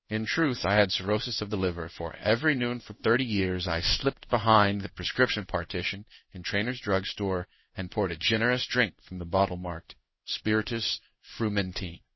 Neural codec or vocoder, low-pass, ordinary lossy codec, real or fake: codec, 16 kHz in and 24 kHz out, 1 kbps, XY-Tokenizer; 7.2 kHz; MP3, 24 kbps; fake